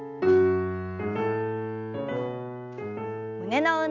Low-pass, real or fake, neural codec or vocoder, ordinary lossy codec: 7.2 kHz; real; none; none